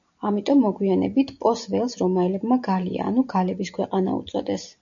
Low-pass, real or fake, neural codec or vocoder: 7.2 kHz; real; none